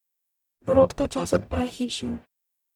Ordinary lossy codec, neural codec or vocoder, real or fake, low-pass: none; codec, 44.1 kHz, 0.9 kbps, DAC; fake; 19.8 kHz